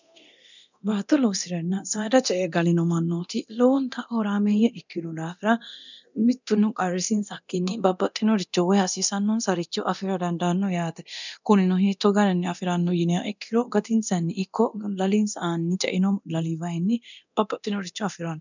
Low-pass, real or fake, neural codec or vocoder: 7.2 kHz; fake; codec, 24 kHz, 0.9 kbps, DualCodec